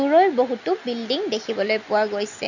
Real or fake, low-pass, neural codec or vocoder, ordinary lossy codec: real; 7.2 kHz; none; none